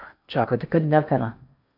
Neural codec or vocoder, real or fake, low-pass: codec, 16 kHz in and 24 kHz out, 0.8 kbps, FocalCodec, streaming, 65536 codes; fake; 5.4 kHz